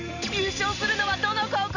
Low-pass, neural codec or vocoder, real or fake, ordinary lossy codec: 7.2 kHz; none; real; none